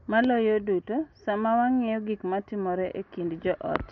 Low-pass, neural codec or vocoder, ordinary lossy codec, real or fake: 7.2 kHz; none; MP3, 48 kbps; real